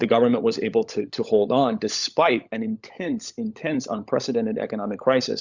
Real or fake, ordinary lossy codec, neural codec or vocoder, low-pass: fake; Opus, 64 kbps; codec, 16 kHz, 16 kbps, FunCodec, trained on LibriTTS, 50 frames a second; 7.2 kHz